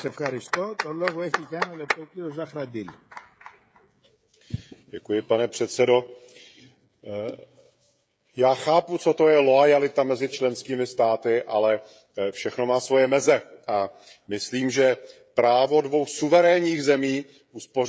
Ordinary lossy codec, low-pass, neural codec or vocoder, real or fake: none; none; codec, 16 kHz, 16 kbps, FreqCodec, smaller model; fake